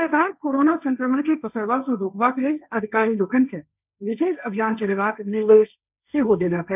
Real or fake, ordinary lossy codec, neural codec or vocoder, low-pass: fake; none; codec, 16 kHz, 1.1 kbps, Voila-Tokenizer; 3.6 kHz